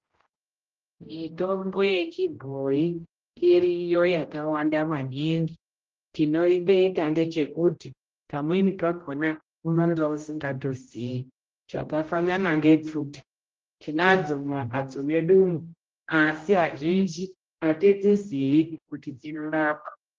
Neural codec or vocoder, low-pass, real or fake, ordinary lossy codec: codec, 16 kHz, 0.5 kbps, X-Codec, HuBERT features, trained on general audio; 7.2 kHz; fake; Opus, 16 kbps